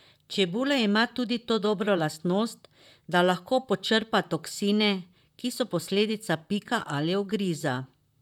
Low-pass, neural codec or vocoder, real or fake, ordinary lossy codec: 19.8 kHz; vocoder, 44.1 kHz, 128 mel bands, Pupu-Vocoder; fake; none